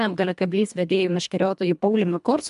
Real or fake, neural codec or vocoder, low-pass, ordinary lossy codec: fake; codec, 24 kHz, 1.5 kbps, HILCodec; 10.8 kHz; MP3, 96 kbps